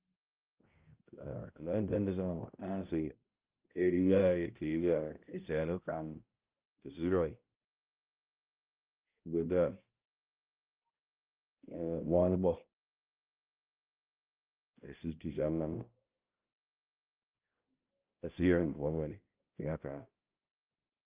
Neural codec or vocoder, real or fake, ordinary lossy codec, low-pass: codec, 16 kHz, 0.5 kbps, X-Codec, HuBERT features, trained on balanced general audio; fake; Opus, 24 kbps; 3.6 kHz